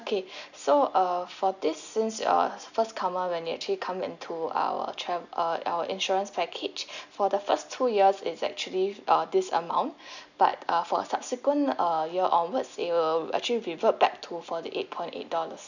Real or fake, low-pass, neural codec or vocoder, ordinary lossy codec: real; 7.2 kHz; none; none